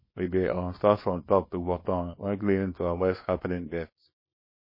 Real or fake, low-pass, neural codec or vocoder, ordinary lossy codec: fake; 5.4 kHz; codec, 24 kHz, 0.9 kbps, WavTokenizer, small release; MP3, 24 kbps